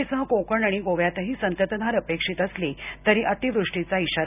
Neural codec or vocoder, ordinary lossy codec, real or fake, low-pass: none; none; real; 3.6 kHz